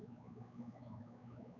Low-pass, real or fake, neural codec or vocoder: 7.2 kHz; fake; codec, 16 kHz, 4 kbps, X-Codec, WavLM features, trained on Multilingual LibriSpeech